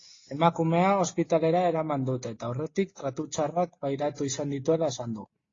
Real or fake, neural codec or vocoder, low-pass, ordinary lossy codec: real; none; 7.2 kHz; AAC, 32 kbps